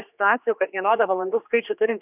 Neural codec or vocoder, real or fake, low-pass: codec, 16 kHz, 2 kbps, X-Codec, HuBERT features, trained on general audio; fake; 3.6 kHz